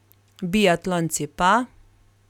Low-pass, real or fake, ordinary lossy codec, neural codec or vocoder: 19.8 kHz; real; none; none